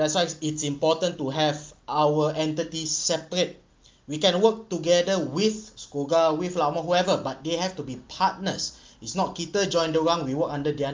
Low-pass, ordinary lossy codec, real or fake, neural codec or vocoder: 7.2 kHz; Opus, 32 kbps; real; none